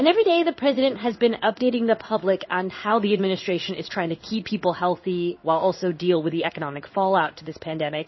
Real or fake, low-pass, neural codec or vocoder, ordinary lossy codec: fake; 7.2 kHz; codec, 16 kHz in and 24 kHz out, 1 kbps, XY-Tokenizer; MP3, 24 kbps